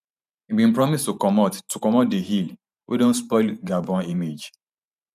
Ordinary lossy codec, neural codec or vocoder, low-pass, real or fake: none; vocoder, 44.1 kHz, 128 mel bands every 512 samples, BigVGAN v2; 14.4 kHz; fake